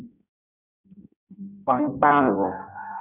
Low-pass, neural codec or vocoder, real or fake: 3.6 kHz; codec, 16 kHz in and 24 kHz out, 0.6 kbps, FireRedTTS-2 codec; fake